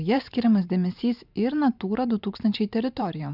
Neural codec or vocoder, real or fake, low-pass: vocoder, 44.1 kHz, 128 mel bands every 512 samples, BigVGAN v2; fake; 5.4 kHz